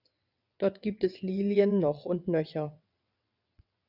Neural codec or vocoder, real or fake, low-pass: vocoder, 22.05 kHz, 80 mel bands, WaveNeXt; fake; 5.4 kHz